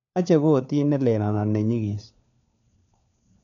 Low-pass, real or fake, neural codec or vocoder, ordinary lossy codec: 7.2 kHz; fake; codec, 16 kHz, 4 kbps, FunCodec, trained on LibriTTS, 50 frames a second; none